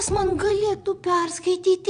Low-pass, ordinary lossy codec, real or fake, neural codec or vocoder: 9.9 kHz; Opus, 64 kbps; fake; vocoder, 22.05 kHz, 80 mel bands, Vocos